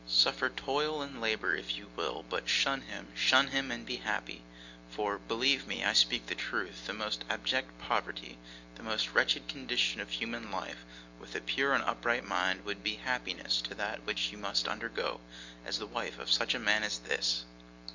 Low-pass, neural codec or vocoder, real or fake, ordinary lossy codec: 7.2 kHz; none; real; Opus, 64 kbps